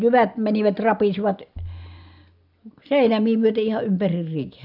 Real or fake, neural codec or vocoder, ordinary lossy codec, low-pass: real; none; none; 5.4 kHz